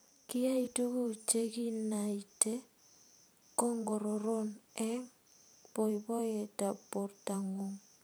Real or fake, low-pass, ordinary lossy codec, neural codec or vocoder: fake; none; none; vocoder, 44.1 kHz, 128 mel bands every 512 samples, BigVGAN v2